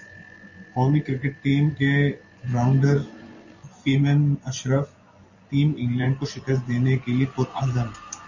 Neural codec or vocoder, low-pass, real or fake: vocoder, 24 kHz, 100 mel bands, Vocos; 7.2 kHz; fake